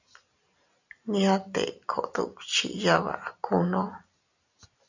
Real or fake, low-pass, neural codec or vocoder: real; 7.2 kHz; none